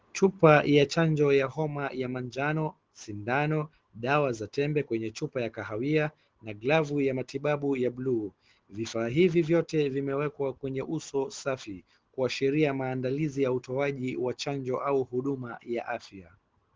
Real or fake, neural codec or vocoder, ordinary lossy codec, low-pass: real; none; Opus, 16 kbps; 7.2 kHz